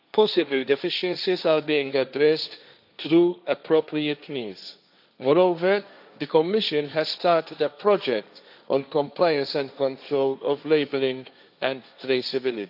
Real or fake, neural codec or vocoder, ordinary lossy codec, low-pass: fake; codec, 16 kHz, 1.1 kbps, Voila-Tokenizer; none; 5.4 kHz